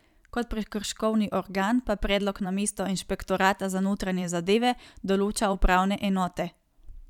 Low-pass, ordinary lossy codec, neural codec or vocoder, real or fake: 19.8 kHz; none; vocoder, 44.1 kHz, 128 mel bands every 512 samples, BigVGAN v2; fake